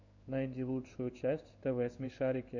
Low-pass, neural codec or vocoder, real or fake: 7.2 kHz; codec, 16 kHz in and 24 kHz out, 1 kbps, XY-Tokenizer; fake